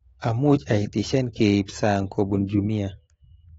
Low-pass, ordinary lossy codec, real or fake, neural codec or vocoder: 19.8 kHz; AAC, 24 kbps; fake; autoencoder, 48 kHz, 128 numbers a frame, DAC-VAE, trained on Japanese speech